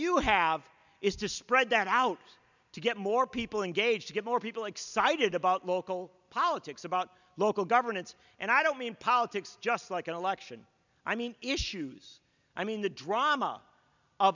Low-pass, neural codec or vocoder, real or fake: 7.2 kHz; none; real